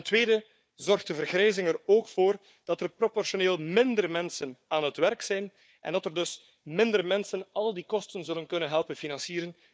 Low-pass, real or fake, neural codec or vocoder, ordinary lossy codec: none; fake; codec, 16 kHz, 4 kbps, FunCodec, trained on Chinese and English, 50 frames a second; none